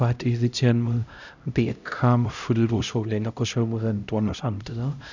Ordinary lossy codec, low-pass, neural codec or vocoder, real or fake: none; 7.2 kHz; codec, 16 kHz, 0.5 kbps, X-Codec, HuBERT features, trained on LibriSpeech; fake